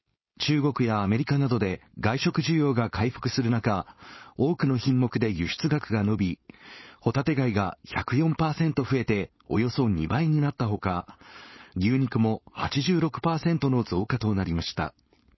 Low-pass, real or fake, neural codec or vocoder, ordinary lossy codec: 7.2 kHz; fake; codec, 16 kHz, 4.8 kbps, FACodec; MP3, 24 kbps